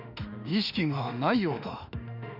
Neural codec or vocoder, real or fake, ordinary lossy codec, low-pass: autoencoder, 48 kHz, 32 numbers a frame, DAC-VAE, trained on Japanese speech; fake; none; 5.4 kHz